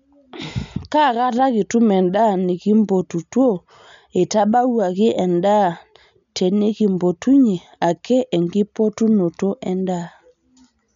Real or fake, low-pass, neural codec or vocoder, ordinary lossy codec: real; 7.2 kHz; none; MP3, 64 kbps